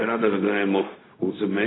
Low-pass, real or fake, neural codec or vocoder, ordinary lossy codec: 7.2 kHz; fake; codec, 16 kHz, 0.4 kbps, LongCat-Audio-Codec; AAC, 16 kbps